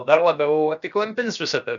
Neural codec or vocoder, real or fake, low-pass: codec, 16 kHz, about 1 kbps, DyCAST, with the encoder's durations; fake; 7.2 kHz